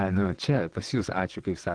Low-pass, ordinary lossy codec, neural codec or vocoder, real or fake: 9.9 kHz; Opus, 16 kbps; vocoder, 22.05 kHz, 80 mel bands, WaveNeXt; fake